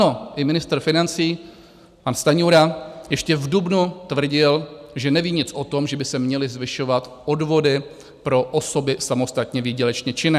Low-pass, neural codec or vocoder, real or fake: 14.4 kHz; none; real